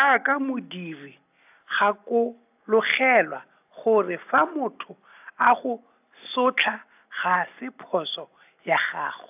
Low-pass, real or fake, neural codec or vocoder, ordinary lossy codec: 3.6 kHz; real; none; AAC, 32 kbps